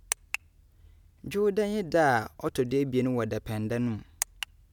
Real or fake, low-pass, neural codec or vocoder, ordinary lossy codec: real; 19.8 kHz; none; none